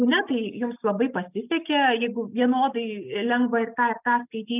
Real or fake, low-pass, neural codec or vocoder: real; 3.6 kHz; none